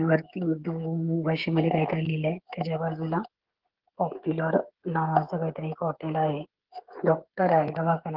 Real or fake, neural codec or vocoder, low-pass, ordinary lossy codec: fake; codec, 16 kHz, 16 kbps, FreqCodec, smaller model; 5.4 kHz; Opus, 16 kbps